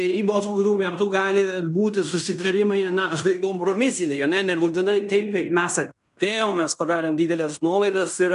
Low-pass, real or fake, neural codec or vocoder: 10.8 kHz; fake; codec, 16 kHz in and 24 kHz out, 0.9 kbps, LongCat-Audio-Codec, fine tuned four codebook decoder